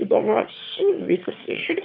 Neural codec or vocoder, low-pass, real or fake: autoencoder, 22.05 kHz, a latent of 192 numbers a frame, VITS, trained on one speaker; 5.4 kHz; fake